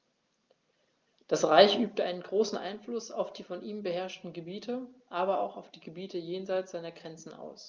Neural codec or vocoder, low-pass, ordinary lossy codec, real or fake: none; 7.2 kHz; Opus, 24 kbps; real